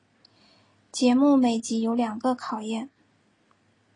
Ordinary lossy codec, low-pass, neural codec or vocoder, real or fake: AAC, 32 kbps; 9.9 kHz; none; real